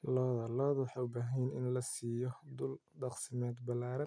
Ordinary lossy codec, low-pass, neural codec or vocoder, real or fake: none; 9.9 kHz; none; real